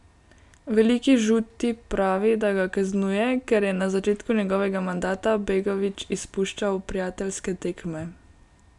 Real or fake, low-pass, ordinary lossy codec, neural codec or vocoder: real; 10.8 kHz; none; none